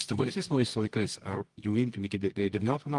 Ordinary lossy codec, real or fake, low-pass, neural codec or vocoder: Opus, 24 kbps; fake; 10.8 kHz; codec, 24 kHz, 0.9 kbps, WavTokenizer, medium music audio release